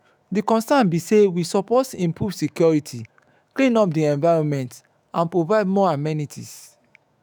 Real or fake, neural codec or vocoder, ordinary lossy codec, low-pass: fake; autoencoder, 48 kHz, 128 numbers a frame, DAC-VAE, trained on Japanese speech; none; none